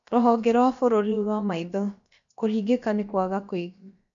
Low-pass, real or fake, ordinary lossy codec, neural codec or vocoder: 7.2 kHz; fake; MP3, 64 kbps; codec, 16 kHz, about 1 kbps, DyCAST, with the encoder's durations